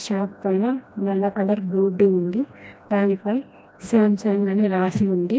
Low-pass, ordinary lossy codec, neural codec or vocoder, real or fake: none; none; codec, 16 kHz, 1 kbps, FreqCodec, smaller model; fake